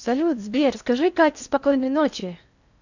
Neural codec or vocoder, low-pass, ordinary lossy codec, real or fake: codec, 16 kHz in and 24 kHz out, 0.6 kbps, FocalCodec, streaming, 2048 codes; 7.2 kHz; none; fake